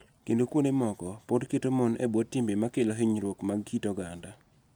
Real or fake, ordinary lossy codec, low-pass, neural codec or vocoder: real; none; none; none